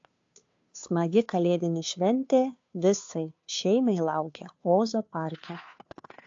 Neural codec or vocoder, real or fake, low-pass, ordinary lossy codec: codec, 16 kHz, 2 kbps, FunCodec, trained on Chinese and English, 25 frames a second; fake; 7.2 kHz; AAC, 48 kbps